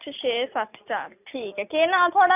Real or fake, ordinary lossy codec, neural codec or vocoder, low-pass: real; none; none; 3.6 kHz